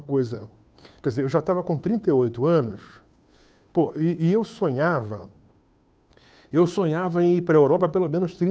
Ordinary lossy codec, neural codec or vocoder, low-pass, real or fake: none; codec, 16 kHz, 2 kbps, FunCodec, trained on Chinese and English, 25 frames a second; none; fake